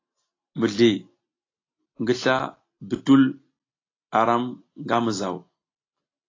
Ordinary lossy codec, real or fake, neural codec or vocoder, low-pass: AAC, 32 kbps; real; none; 7.2 kHz